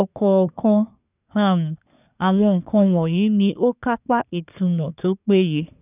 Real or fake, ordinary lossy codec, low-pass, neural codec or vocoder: fake; none; 3.6 kHz; codec, 24 kHz, 1 kbps, SNAC